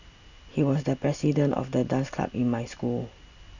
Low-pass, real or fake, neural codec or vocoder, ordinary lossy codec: 7.2 kHz; real; none; none